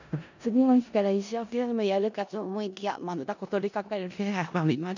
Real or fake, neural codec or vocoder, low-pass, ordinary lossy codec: fake; codec, 16 kHz in and 24 kHz out, 0.4 kbps, LongCat-Audio-Codec, four codebook decoder; 7.2 kHz; none